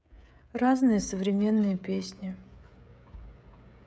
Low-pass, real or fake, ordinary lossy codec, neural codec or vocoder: none; fake; none; codec, 16 kHz, 16 kbps, FreqCodec, smaller model